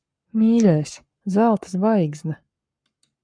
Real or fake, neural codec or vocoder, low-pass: fake; codec, 44.1 kHz, 7.8 kbps, Pupu-Codec; 9.9 kHz